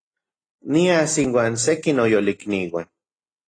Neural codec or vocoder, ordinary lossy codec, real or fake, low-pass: none; AAC, 32 kbps; real; 9.9 kHz